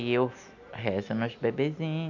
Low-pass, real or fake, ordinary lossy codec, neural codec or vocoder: 7.2 kHz; real; none; none